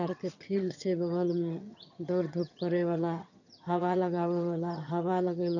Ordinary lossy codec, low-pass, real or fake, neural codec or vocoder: none; 7.2 kHz; fake; vocoder, 22.05 kHz, 80 mel bands, HiFi-GAN